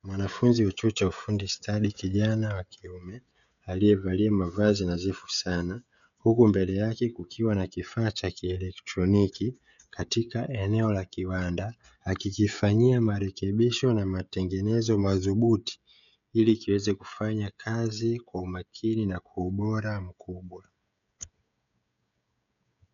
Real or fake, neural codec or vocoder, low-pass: fake; codec, 16 kHz, 16 kbps, FreqCodec, smaller model; 7.2 kHz